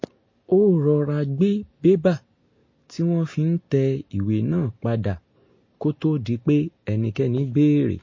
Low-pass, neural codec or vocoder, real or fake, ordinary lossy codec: 7.2 kHz; none; real; MP3, 32 kbps